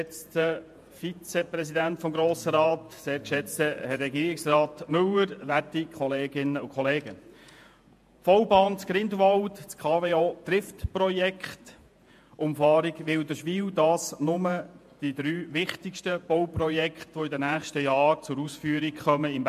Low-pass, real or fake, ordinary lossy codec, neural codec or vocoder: 14.4 kHz; fake; MP3, 64 kbps; vocoder, 48 kHz, 128 mel bands, Vocos